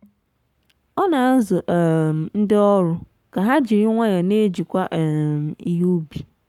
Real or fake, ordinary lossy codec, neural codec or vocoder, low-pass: fake; none; codec, 44.1 kHz, 7.8 kbps, Pupu-Codec; 19.8 kHz